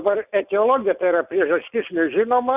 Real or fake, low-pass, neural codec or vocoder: real; 3.6 kHz; none